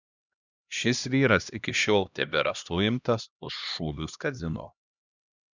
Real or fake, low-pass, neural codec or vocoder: fake; 7.2 kHz; codec, 16 kHz, 1 kbps, X-Codec, HuBERT features, trained on LibriSpeech